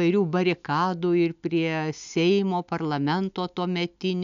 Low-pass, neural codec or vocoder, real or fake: 7.2 kHz; none; real